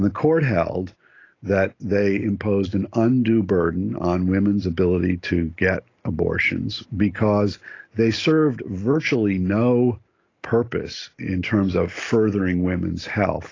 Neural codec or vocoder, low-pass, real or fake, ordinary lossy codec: none; 7.2 kHz; real; AAC, 32 kbps